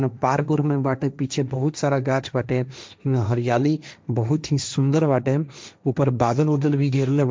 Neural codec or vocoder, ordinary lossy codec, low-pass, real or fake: codec, 16 kHz, 1.1 kbps, Voila-Tokenizer; none; none; fake